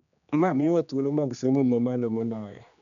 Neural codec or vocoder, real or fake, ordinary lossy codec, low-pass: codec, 16 kHz, 2 kbps, X-Codec, HuBERT features, trained on general audio; fake; none; 7.2 kHz